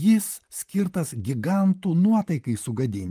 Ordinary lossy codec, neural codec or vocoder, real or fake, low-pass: Opus, 32 kbps; vocoder, 44.1 kHz, 128 mel bands, Pupu-Vocoder; fake; 14.4 kHz